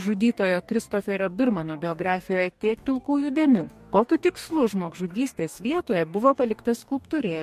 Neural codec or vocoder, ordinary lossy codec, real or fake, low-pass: codec, 44.1 kHz, 2.6 kbps, DAC; MP3, 64 kbps; fake; 14.4 kHz